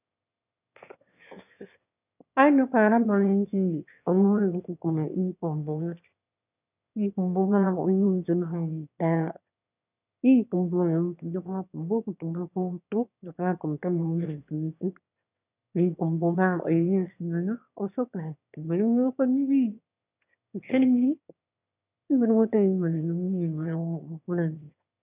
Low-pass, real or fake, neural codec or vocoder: 3.6 kHz; fake; autoencoder, 22.05 kHz, a latent of 192 numbers a frame, VITS, trained on one speaker